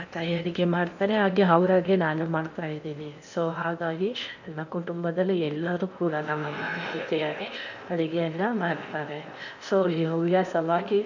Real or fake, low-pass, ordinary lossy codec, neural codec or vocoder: fake; 7.2 kHz; none; codec, 16 kHz in and 24 kHz out, 0.8 kbps, FocalCodec, streaming, 65536 codes